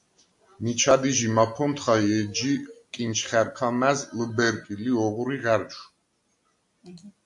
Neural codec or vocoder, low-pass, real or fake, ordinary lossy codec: none; 10.8 kHz; real; AAC, 64 kbps